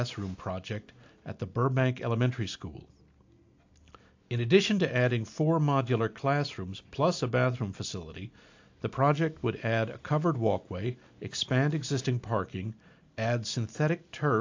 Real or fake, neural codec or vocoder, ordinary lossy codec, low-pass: real; none; AAC, 48 kbps; 7.2 kHz